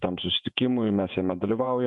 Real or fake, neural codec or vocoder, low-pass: fake; vocoder, 48 kHz, 128 mel bands, Vocos; 10.8 kHz